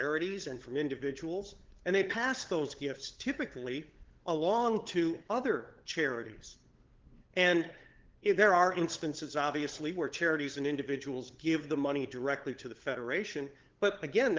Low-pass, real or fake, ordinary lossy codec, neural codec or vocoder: 7.2 kHz; fake; Opus, 16 kbps; codec, 16 kHz, 8 kbps, FunCodec, trained on LibriTTS, 25 frames a second